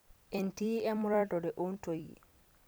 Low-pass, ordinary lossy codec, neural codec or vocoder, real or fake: none; none; vocoder, 44.1 kHz, 128 mel bands every 256 samples, BigVGAN v2; fake